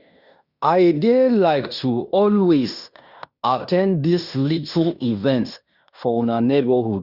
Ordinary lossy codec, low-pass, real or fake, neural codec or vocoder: Opus, 64 kbps; 5.4 kHz; fake; codec, 16 kHz in and 24 kHz out, 0.9 kbps, LongCat-Audio-Codec, fine tuned four codebook decoder